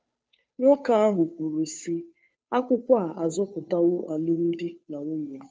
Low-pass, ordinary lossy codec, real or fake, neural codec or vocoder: none; none; fake; codec, 16 kHz, 2 kbps, FunCodec, trained on Chinese and English, 25 frames a second